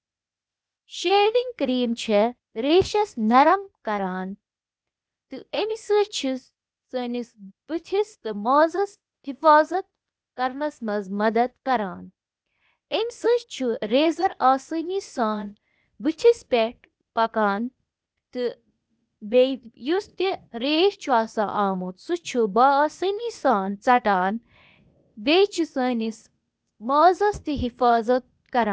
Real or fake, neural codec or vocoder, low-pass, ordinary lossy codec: fake; codec, 16 kHz, 0.8 kbps, ZipCodec; none; none